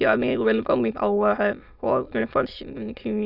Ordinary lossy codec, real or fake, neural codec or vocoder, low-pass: none; fake; autoencoder, 22.05 kHz, a latent of 192 numbers a frame, VITS, trained on many speakers; 5.4 kHz